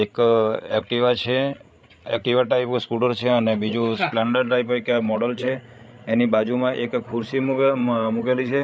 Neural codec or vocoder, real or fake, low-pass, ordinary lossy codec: codec, 16 kHz, 16 kbps, FreqCodec, larger model; fake; none; none